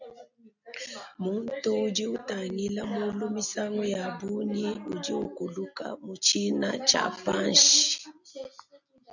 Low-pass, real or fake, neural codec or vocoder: 7.2 kHz; real; none